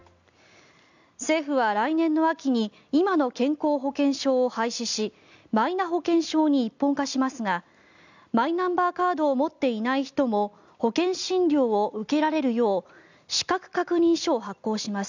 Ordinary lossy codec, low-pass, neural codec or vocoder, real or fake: none; 7.2 kHz; none; real